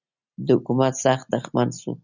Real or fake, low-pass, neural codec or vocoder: real; 7.2 kHz; none